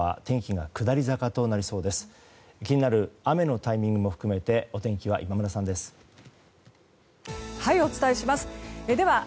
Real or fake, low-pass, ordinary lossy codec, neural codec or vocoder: real; none; none; none